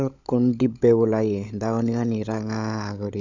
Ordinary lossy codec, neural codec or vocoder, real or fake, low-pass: none; codec, 16 kHz, 16 kbps, FunCodec, trained on LibriTTS, 50 frames a second; fake; 7.2 kHz